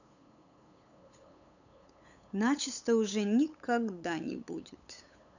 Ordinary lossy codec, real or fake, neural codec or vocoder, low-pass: none; fake; codec, 16 kHz, 8 kbps, FunCodec, trained on LibriTTS, 25 frames a second; 7.2 kHz